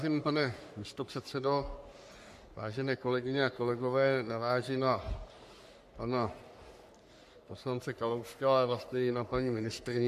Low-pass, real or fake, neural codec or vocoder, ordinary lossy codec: 14.4 kHz; fake; codec, 44.1 kHz, 3.4 kbps, Pupu-Codec; MP3, 96 kbps